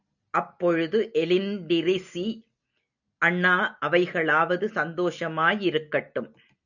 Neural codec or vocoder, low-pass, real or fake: none; 7.2 kHz; real